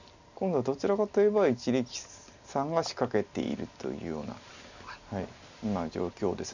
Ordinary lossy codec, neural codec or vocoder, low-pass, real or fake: none; none; 7.2 kHz; real